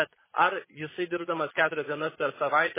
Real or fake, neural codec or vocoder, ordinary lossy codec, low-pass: fake; vocoder, 22.05 kHz, 80 mel bands, Vocos; MP3, 16 kbps; 3.6 kHz